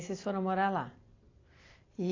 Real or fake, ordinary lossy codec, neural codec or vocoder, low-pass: real; AAC, 32 kbps; none; 7.2 kHz